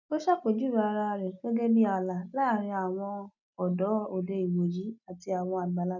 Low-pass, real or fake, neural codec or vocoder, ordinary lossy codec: 7.2 kHz; real; none; none